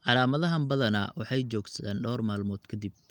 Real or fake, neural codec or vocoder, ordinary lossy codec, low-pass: real; none; Opus, 32 kbps; 19.8 kHz